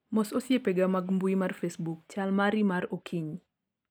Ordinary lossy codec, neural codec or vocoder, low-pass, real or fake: none; none; 19.8 kHz; real